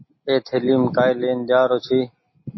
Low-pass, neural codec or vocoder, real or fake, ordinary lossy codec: 7.2 kHz; none; real; MP3, 24 kbps